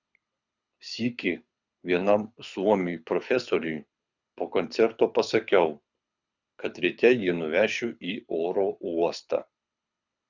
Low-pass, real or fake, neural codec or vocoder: 7.2 kHz; fake; codec, 24 kHz, 6 kbps, HILCodec